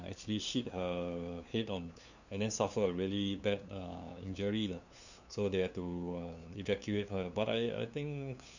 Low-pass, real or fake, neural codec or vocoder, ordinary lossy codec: 7.2 kHz; fake; codec, 16 kHz, 2 kbps, FunCodec, trained on LibriTTS, 25 frames a second; none